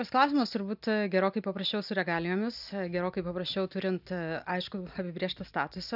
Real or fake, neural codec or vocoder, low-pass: real; none; 5.4 kHz